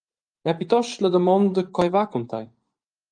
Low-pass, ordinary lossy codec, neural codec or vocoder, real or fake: 9.9 kHz; Opus, 32 kbps; vocoder, 24 kHz, 100 mel bands, Vocos; fake